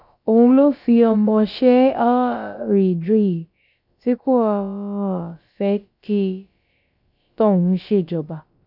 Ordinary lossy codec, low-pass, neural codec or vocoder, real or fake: none; 5.4 kHz; codec, 16 kHz, about 1 kbps, DyCAST, with the encoder's durations; fake